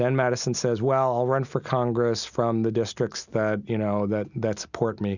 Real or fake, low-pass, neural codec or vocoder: real; 7.2 kHz; none